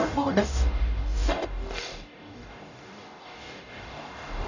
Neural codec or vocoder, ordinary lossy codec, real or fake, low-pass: codec, 44.1 kHz, 0.9 kbps, DAC; none; fake; 7.2 kHz